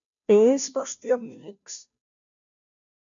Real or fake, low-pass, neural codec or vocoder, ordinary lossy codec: fake; 7.2 kHz; codec, 16 kHz, 0.5 kbps, FunCodec, trained on Chinese and English, 25 frames a second; MP3, 64 kbps